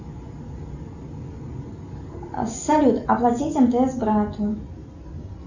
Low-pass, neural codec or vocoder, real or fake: 7.2 kHz; none; real